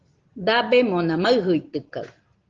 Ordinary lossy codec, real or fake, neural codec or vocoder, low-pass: Opus, 16 kbps; real; none; 7.2 kHz